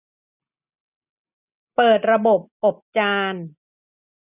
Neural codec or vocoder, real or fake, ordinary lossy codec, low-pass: none; real; none; 3.6 kHz